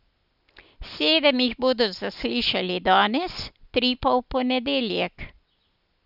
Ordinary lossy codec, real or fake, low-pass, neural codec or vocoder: none; real; 5.4 kHz; none